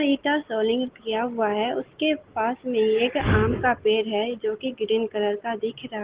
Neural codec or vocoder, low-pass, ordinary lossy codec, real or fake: none; 3.6 kHz; Opus, 16 kbps; real